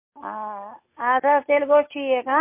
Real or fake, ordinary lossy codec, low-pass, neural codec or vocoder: real; MP3, 24 kbps; 3.6 kHz; none